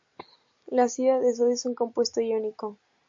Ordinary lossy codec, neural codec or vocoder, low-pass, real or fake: MP3, 48 kbps; none; 7.2 kHz; real